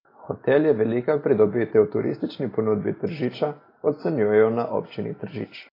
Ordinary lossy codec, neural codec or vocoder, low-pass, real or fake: AAC, 24 kbps; none; 5.4 kHz; real